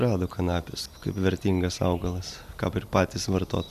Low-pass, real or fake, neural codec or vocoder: 14.4 kHz; real; none